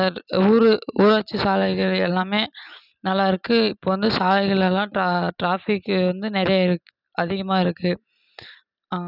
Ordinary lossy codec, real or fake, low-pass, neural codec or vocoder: none; real; 5.4 kHz; none